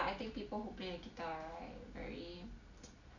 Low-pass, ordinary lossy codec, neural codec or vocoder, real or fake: 7.2 kHz; none; none; real